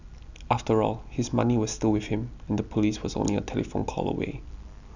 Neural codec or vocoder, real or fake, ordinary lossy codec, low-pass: none; real; none; 7.2 kHz